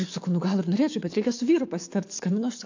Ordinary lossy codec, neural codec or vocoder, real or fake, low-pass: AAC, 48 kbps; codec, 44.1 kHz, 7.8 kbps, DAC; fake; 7.2 kHz